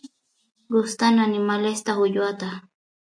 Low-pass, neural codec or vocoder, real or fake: 9.9 kHz; none; real